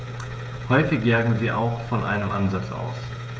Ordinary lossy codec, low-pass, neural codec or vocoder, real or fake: none; none; codec, 16 kHz, 16 kbps, FreqCodec, smaller model; fake